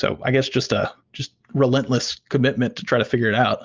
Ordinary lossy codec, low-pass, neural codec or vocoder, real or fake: Opus, 24 kbps; 7.2 kHz; none; real